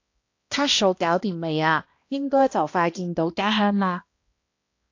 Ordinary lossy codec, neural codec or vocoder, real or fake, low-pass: AAC, 48 kbps; codec, 16 kHz, 1 kbps, X-Codec, HuBERT features, trained on balanced general audio; fake; 7.2 kHz